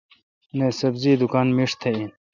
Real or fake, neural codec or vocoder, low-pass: real; none; 7.2 kHz